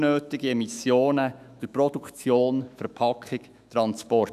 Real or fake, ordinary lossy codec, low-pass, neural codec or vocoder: fake; none; 14.4 kHz; autoencoder, 48 kHz, 128 numbers a frame, DAC-VAE, trained on Japanese speech